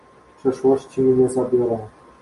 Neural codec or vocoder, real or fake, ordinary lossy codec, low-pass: none; real; MP3, 48 kbps; 14.4 kHz